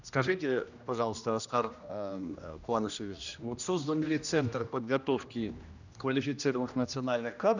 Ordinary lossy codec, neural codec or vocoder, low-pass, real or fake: none; codec, 16 kHz, 1 kbps, X-Codec, HuBERT features, trained on general audio; 7.2 kHz; fake